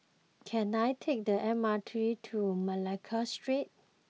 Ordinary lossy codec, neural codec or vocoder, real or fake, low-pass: none; none; real; none